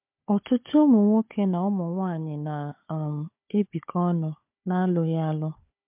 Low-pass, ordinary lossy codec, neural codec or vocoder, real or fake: 3.6 kHz; MP3, 32 kbps; codec, 16 kHz, 4 kbps, FunCodec, trained on Chinese and English, 50 frames a second; fake